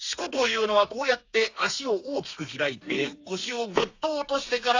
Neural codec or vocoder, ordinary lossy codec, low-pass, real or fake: codec, 32 kHz, 1.9 kbps, SNAC; AAC, 48 kbps; 7.2 kHz; fake